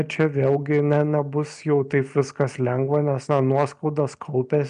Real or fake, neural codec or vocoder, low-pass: real; none; 9.9 kHz